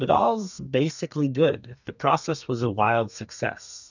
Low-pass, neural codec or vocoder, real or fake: 7.2 kHz; codec, 32 kHz, 1.9 kbps, SNAC; fake